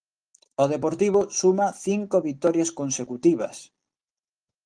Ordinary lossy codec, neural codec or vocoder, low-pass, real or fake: Opus, 24 kbps; none; 9.9 kHz; real